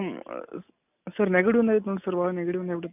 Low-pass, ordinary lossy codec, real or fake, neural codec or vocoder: 3.6 kHz; none; real; none